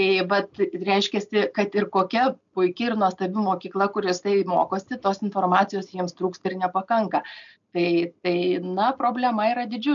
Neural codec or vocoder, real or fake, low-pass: none; real; 7.2 kHz